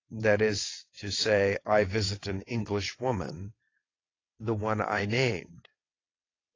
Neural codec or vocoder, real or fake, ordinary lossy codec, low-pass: vocoder, 22.05 kHz, 80 mel bands, WaveNeXt; fake; AAC, 32 kbps; 7.2 kHz